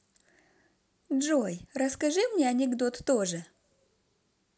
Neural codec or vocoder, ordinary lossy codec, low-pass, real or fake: none; none; none; real